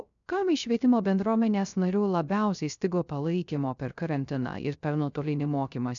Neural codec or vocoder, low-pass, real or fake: codec, 16 kHz, 0.3 kbps, FocalCodec; 7.2 kHz; fake